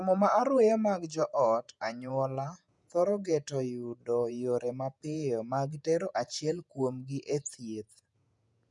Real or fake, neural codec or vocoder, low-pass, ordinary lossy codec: fake; vocoder, 48 kHz, 128 mel bands, Vocos; 10.8 kHz; none